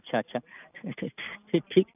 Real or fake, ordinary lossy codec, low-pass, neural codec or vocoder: real; none; 3.6 kHz; none